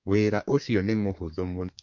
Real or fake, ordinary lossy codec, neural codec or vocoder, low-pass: fake; MP3, 48 kbps; codec, 32 kHz, 1.9 kbps, SNAC; 7.2 kHz